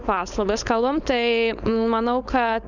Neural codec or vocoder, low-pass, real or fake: codec, 16 kHz, 4.8 kbps, FACodec; 7.2 kHz; fake